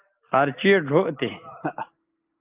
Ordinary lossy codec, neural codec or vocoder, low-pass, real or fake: Opus, 32 kbps; none; 3.6 kHz; real